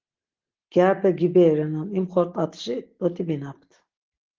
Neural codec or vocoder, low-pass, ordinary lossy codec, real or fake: none; 7.2 kHz; Opus, 16 kbps; real